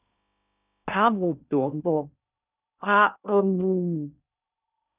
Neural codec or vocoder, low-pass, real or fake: codec, 16 kHz in and 24 kHz out, 0.6 kbps, FocalCodec, streaming, 2048 codes; 3.6 kHz; fake